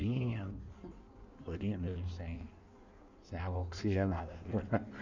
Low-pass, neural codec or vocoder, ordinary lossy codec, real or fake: 7.2 kHz; codec, 16 kHz in and 24 kHz out, 1.1 kbps, FireRedTTS-2 codec; none; fake